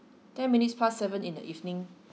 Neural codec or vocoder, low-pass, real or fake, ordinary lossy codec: none; none; real; none